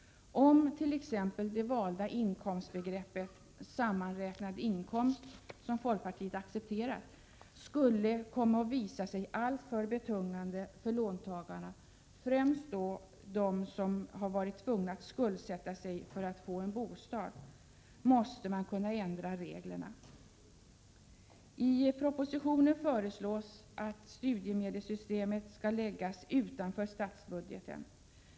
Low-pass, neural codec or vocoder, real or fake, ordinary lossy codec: none; none; real; none